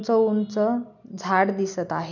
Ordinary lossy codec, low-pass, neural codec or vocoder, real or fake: none; 7.2 kHz; none; real